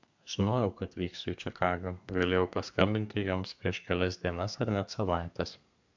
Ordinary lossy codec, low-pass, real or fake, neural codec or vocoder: MP3, 64 kbps; 7.2 kHz; fake; codec, 32 kHz, 1.9 kbps, SNAC